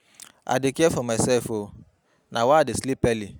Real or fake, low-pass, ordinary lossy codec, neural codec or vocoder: real; none; none; none